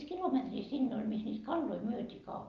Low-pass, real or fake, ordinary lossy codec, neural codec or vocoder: 7.2 kHz; real; Opus, 32 kbps; none